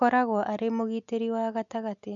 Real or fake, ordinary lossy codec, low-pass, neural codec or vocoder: real; none; 7.2 kHz; none